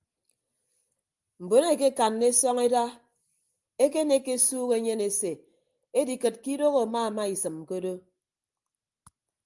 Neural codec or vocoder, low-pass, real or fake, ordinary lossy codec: none; 10.8 kHz; real; Opus, 32 kbps